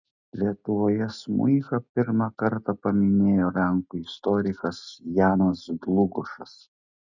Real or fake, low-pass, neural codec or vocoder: real; 7.2 kHz; none